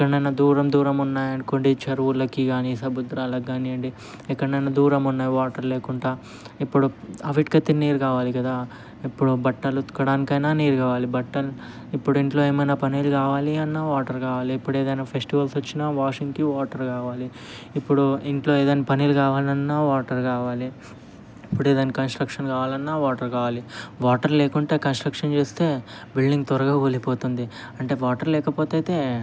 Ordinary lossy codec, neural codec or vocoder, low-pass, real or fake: none; none; none; real